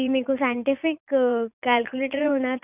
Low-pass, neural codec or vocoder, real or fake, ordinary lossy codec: 3.6 kHz; vocoder, 44.1 kHz, 80 mel bands, Vocos; fake; none